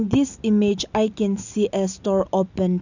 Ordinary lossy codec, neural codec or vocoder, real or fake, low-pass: none; none; real; 7.2 kHz